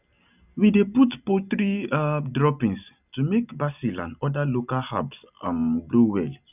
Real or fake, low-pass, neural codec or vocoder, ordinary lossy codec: real; 3.6 kHz; none; none